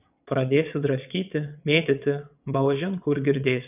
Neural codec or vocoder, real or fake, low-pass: vocoder, 22.05 kHz, 80 mel bands, WaveNeXt; fake; 3.6 kHz